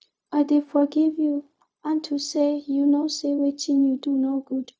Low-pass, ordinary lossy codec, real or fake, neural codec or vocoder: none; none; fake; codec, 16 kHz, 0.4 kbps, LongCat-Audio-Codec